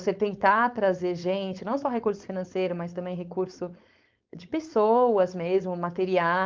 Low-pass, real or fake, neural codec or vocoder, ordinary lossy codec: 7.2 kHz; fake; codec, 16 kHz, 4.8 kbps, FACodec; Opus, 24 kbps